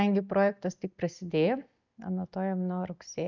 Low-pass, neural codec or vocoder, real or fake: 7.2 kHz; none; real